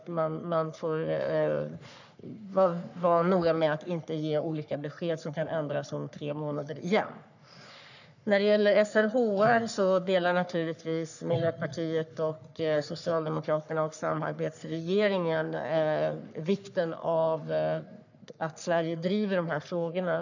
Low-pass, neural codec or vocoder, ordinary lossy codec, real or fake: 7.2 kHz; codec, 44.1 kHz, 3.4 kbps, Pupu-Codec; none; fake